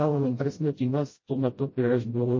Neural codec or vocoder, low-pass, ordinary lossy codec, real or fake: codec, 16 kHz, 0.5 kbps, FreqCodec, smaller model; 7.2 kHz; MP3, 32 kbps; fake